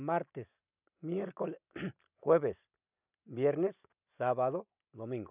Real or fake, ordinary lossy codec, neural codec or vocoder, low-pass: real; none; none; 3.6 kHz